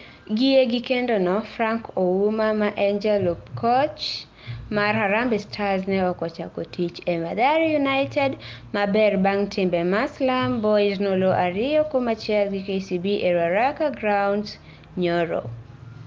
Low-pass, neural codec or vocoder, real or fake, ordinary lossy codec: 7.2 kHz; none; real; Opus, 24 kbps